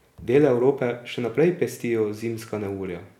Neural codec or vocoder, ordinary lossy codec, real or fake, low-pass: none; none; real; 19.8 kHz